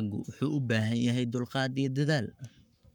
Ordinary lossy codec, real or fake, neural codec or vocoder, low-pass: none; fake; codec, 44.1 kHz, 7.8 kbps, Pupu-Codec; 19.8 kHz